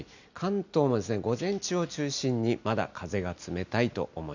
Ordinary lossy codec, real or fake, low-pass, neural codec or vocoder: none; real; 7.2 kHz; none